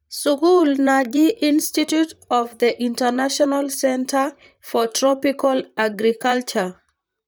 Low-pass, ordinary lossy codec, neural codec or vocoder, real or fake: none; none; vocoder, 44.1 kHz, 128 mel bands, Pupu-Vocoder; fake